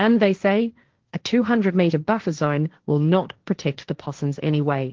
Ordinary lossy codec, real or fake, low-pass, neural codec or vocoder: Opus, 16 kbps; fake; 7.2 kHz; codec, 16 kHz, 1.1 kbps, Voila-Tokenizer